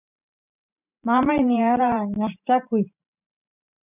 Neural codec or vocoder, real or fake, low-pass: vocoder, 44.1 kHz, 128 mel bands every 512 samples, BigVGAN v2; fake; 3.6 kHz